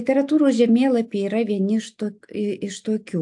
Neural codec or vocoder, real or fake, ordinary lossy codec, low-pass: none; real; AAC, 64 kbps; 10.8 kHz